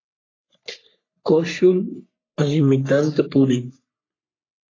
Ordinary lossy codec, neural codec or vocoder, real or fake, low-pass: AAC, 32 kbps; codec, 44.1 kHz, 3.4 kbps, Pupu-Codec; fake; 7.2 kHz